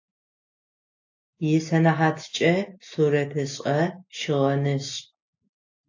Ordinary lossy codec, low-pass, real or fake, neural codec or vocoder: AAC, 48 kbps; 7.2 kHz; real; none